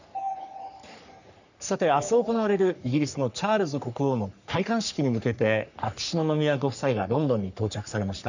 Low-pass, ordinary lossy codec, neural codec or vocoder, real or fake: 7.2 kHz; none; codec, 44.1 kHz, 3.4 kbps, Pupu-Codec; fake